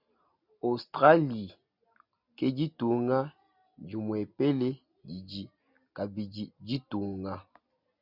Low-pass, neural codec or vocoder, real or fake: 5.4 kHz; none; real